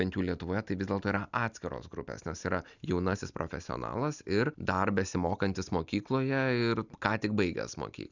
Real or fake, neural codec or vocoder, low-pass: real; none; 7.2 kHz